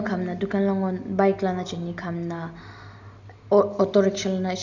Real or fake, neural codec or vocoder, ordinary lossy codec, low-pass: real; none; none; 7.2 kHz